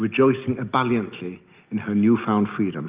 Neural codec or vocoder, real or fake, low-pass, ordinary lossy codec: none; real; 3.6 kHz; Opus, 24 kbps